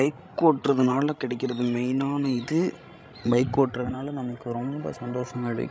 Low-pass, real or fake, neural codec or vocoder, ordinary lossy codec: none; fake; codec, 16 kHz, 16 kbps, FreqCodec, larger model; none